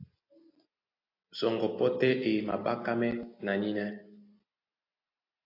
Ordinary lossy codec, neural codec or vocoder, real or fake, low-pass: AAC, 32 kbps; none; real; 5.4 kHz